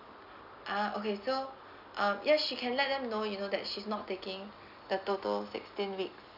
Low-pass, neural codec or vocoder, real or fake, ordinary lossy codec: 5.4 kHz; none; real; none